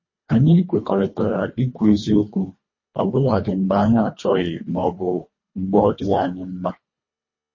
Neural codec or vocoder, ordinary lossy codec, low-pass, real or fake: codec, 24 kHz, 1.5 kbps, HILCodec; MP3, 32 kbps; 7.2 kHz; fake